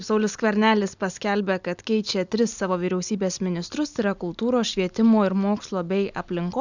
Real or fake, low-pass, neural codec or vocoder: real; 7.2 kHz; none